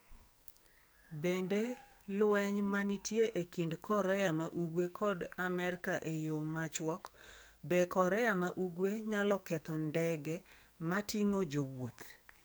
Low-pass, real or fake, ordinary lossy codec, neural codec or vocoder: none; fake; none; codec, 44.1 kHz, 2.6 kbps, SNAC